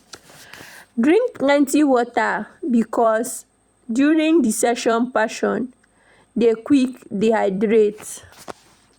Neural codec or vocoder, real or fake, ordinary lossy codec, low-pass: vocoder, 44.1 kHz, 128 mel bands every 256 samples, BigVGAN v2; fake; Opus, 64 kbps; 19.8 kHz